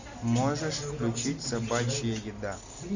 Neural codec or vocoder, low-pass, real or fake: none; 7.2 kHz; real